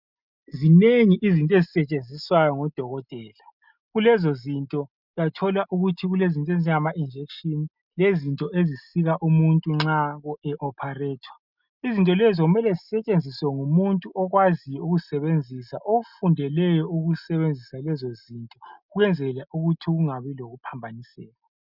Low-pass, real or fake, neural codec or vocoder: 5.4 kHz; real; none